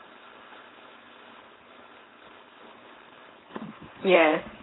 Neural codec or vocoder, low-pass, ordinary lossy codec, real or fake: codec, 16 kHz, 4.8 kbps, FACodec; 7.2 kHz; AAC, 16 kbps; fake